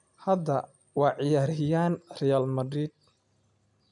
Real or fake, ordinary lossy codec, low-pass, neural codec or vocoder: real; none; 10.8 kHz; none